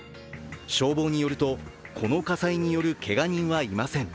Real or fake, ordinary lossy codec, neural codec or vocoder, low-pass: real; none; none; none